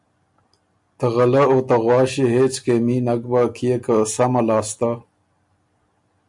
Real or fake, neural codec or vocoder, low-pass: real; none; 10.8 kHz